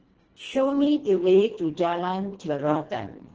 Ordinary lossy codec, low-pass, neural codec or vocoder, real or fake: Opus, 16 kbps; 7.2 kHz; codec, 24 kHz, 1.5 kbps, HILCodec; fake